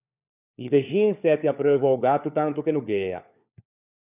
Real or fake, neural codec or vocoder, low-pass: fake; codec, 16 kHz, 4 kbps, FunCodec, trained on LibriTTS, 50 frames a second; 3.6 kHz